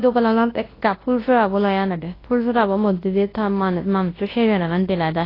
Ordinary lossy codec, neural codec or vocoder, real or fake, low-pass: AAC, 24 kbps; codec, 24 kHz, 0.9 kbps, WavTokenizer, large speech release; fake; 5.4 kHz